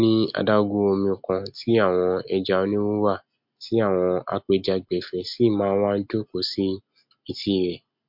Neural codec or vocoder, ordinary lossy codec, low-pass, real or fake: none; MP3, 48 kbps; 5.4 kHz; real